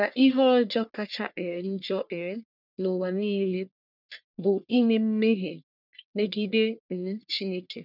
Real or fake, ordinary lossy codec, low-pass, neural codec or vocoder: fake; none; 5.4 kHz; codec, 44.1 kHz, 1.7 kbps, Pupu-Codec